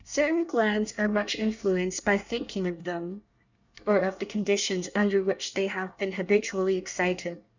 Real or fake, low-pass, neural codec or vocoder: fake; 7.2 kHz; codec, 24 kHz, 1 kbps, SNAC